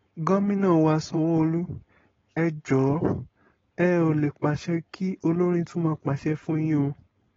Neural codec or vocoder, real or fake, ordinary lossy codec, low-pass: codec, 16 kHz, 4.8 kbps, FACodec; fake; AAC, 24 kbps; 7.2 kHz